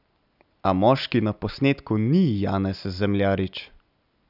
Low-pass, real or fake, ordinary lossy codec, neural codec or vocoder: 5.4 kHz; real; none; none